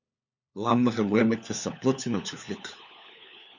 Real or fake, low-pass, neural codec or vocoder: fake; 7.2 kHz; codec, 16 kHz, 4 kbps, FunCodec, trained on LibriTTS, 50 frames a second